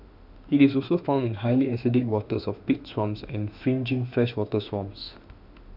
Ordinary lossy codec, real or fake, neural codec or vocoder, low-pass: none; fake; autoencoder, 48 kHz, 32 numbers a frame, DAC-VAE, trained on Japanese speech; 5.4 kHz